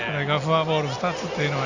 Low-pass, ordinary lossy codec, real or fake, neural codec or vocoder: 7.2 kHz; Opus, 64 kbps; real; none